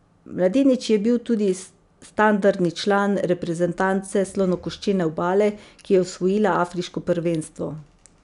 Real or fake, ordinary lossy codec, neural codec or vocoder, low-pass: real; none; none; 10.8 kHz